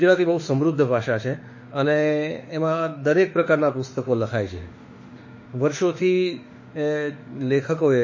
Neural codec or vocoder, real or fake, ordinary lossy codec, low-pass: autoencoder, 48 kHz, 32 numbers a frame, DAC-VAE, trained on Japanese speech; fake; MP3, 32 kbps; 7.2 kHz